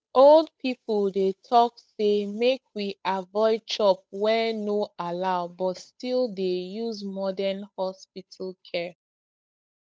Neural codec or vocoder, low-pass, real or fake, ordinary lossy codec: codec, 16 kHz, 8 kbps, FunCodec, trained on Chinese and English, 25 frames a second; none; fake; none